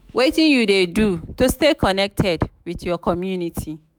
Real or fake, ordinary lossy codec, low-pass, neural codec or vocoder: fake; none; none; autoencoder, 48 kHz, 128 numbers a frame, DAC-VAE, trained on Japanese speech